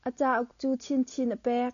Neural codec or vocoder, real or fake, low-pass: none; real; 7.2 kHz